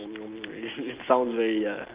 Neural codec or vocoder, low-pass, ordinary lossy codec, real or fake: none; 3.6 kHz; Opus, 32 kbps; real